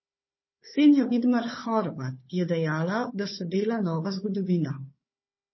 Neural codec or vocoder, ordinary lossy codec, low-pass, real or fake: codec, 16 kHz, 4 kbps, FunCodec, trained on Chinese and English, 50 frames a second; MP3, 24 kbps; 7.2 kHz; fake